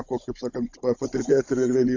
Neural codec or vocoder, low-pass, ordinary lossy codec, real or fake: codec, 16 kHz, 16 kbps, FunCodec, trained on Chinese and English, 50 frames a second; 7.2 kHz; AAC, 48 kbps; fake